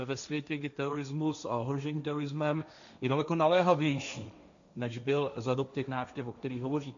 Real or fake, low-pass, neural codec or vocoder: fake; 7.2 kHz; codec, 16 kHz, 1.1 kbps, Voila-Tokenizer